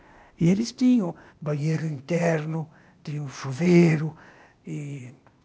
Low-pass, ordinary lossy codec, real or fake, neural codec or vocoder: none; none; fake; codec, 16 kHz, 0.8 kbps, ZipCodec